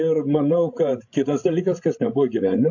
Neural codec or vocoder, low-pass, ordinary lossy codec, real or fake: codec, 16 kHz, 16 kbps, FreqCodec, larger model; 7.2 kHz; AAC, 48 kbps; fake